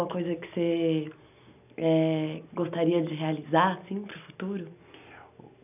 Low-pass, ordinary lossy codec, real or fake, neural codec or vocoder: 3.6 kHz; none; real; none